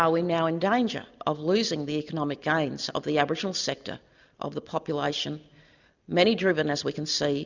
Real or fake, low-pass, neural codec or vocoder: real; 7.2 kHz; none